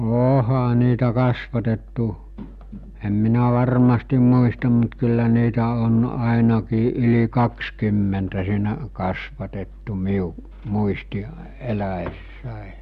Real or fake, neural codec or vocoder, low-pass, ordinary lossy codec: real; none; 14.4 kHz; Opus, 64 kbps